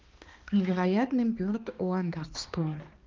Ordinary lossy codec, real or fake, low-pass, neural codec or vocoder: Opus, 32 kbps; fake; 7.2 kHz; codec, 16 kHz, 2 kbps, X-Codec, HuBERT features, trained on balanced general audio